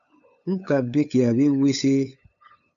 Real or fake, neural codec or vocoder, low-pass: fake; codec, 16 kHz, 8 kbps, FunCodec, trained on LibriTTS, 25 frames a second; 7.2 kHz